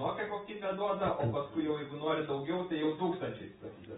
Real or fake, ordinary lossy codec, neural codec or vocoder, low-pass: real; AAC, 16 kbps; none; 7.2 kHz